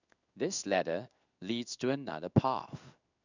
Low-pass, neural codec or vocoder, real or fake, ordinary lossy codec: 7.2 kHz; codec, 16 kHz in and 24 kHz out, 1 kbps, XY-Tokenizer; fake; none